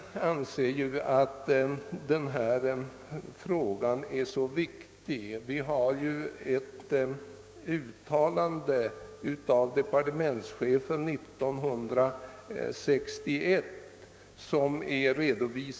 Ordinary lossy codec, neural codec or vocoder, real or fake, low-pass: none; codec, 16 kHz, 6 kbps, DAC; fake; none